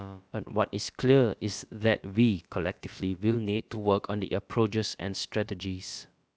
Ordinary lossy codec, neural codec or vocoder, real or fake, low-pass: none; codec, 16 kHz, about 1 kbps, DyCAST, with the encoder's durations; fake; none